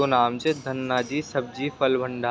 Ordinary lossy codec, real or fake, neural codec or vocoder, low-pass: none; real; none; none